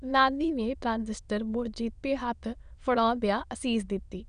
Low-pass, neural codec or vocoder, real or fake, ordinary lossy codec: 9.9 kHz; autoencoder, 22.05 kHz, a latent of 192 numbers a frame, VITS, trained on many speakers; fake; none